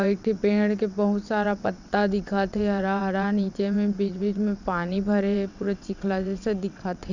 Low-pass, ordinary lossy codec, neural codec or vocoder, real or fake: 7.2 kHz; none; vocoder, 22.05 kHz, 80 mel bands, WaveNeXt; fake